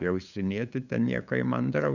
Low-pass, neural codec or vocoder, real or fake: 7.2 kHz; codec, 16 kHz, 8 kbps, FunCodec, trained on LibriTTS, 25 frames a second; fake